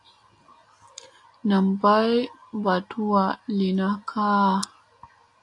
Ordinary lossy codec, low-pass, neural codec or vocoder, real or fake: AAC, 48 kbps; 10.8 kHz; none; real